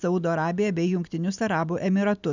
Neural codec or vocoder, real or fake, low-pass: none; real; 7.2 kHz